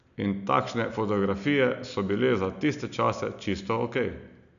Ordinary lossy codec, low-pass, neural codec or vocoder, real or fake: none; 7.2 kHz; none; real